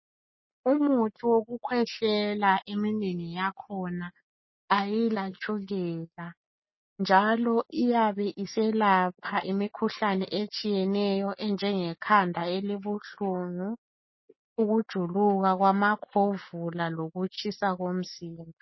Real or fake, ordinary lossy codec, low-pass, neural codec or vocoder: real; MP3, 24 kbps; 7.2 kHz; none